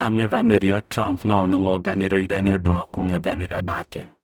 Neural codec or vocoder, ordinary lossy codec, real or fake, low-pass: codec, 44.1 kHz, 0.9 kbps, DAC; none; fake; none